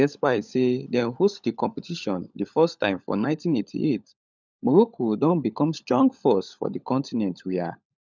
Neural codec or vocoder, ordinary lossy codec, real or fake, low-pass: codec, 16 kHz, 16 kbps, FunCodec, trained on LibriTTS, 50 frames a second; none; fake; 7.2 kHz